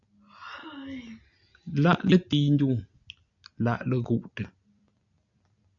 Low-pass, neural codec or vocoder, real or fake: 7.2 kHz; none; real